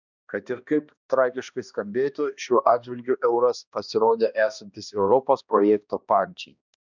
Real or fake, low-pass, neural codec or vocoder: fake; 7.2 kHz; codec, 16 kHz, 1 kbps, X-Codec, HuBERT features, trained on balanced general audio